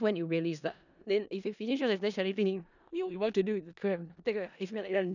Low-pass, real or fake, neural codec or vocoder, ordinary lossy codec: 7.2 kHz; fake; codec, 16 kHz in and 24 kHz out, 0.4 kbps, LongCat-Audio-Codec, four codebook decoder; none